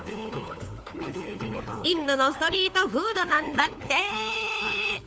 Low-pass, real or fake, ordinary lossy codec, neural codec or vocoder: none; fake; none; codec, 16 kHz, 4 kbps, FunCodec, trained on LibriTTS, 50 frames a second